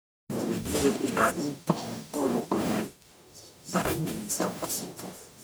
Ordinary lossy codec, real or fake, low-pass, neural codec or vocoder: none; fake; none; codec, 44.1 kHz, 0.9 kbps, DAC